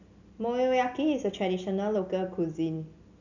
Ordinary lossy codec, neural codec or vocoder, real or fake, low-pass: none; none; real; 7.2 kHz